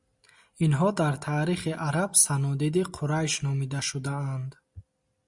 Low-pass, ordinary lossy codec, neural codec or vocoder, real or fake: 10.8 kHz; Opus, 64 kbps; none; real